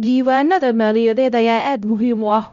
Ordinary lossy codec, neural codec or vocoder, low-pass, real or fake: none; codec, 16 kHz, 0.5 kbps, X-Codec, HuBERT features, trained on LibriSpeech; 7.2 kHz; fake